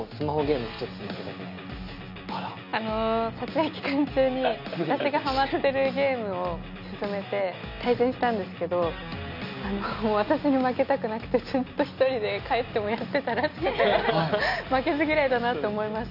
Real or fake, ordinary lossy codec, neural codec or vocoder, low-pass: real; none; none; 5.4 kHz